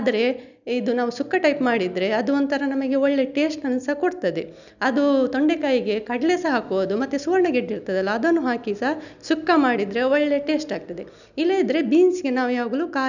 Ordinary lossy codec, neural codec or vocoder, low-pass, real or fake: none; none; 7.2 kHz; real